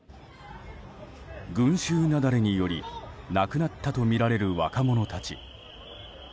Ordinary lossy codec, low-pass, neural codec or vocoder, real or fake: none; none; none; real